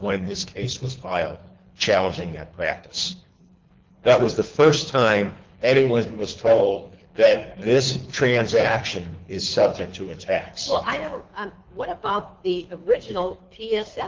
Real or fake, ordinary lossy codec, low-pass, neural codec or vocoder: fake; Opus, 32 kbps; 7.2 kHz; codec, 24 kHz, 3 kbps, HILCodec